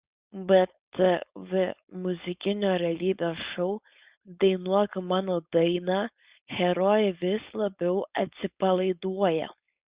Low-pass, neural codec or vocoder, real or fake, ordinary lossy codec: 3.6 kHz; codec, 16 kHz, 4.8 kbps, FACodec; fake; Opus, 64 kbps